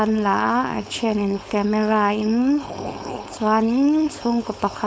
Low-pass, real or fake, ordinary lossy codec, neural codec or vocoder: none; fake; none; codec, 16 kHz, 4.8 kbps, FACodec